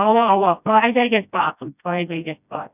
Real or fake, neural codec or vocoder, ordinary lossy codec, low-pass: fake; codec, 16 kHz, 1 kbps, FreqCodec, smaller model; none; 3.6 kHz